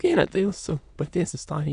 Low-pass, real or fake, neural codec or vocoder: 9.9 kHz; fake; autoencoder, 22.05 kHz, a latent of 192 numbers a frame, VITS, trained on many speakers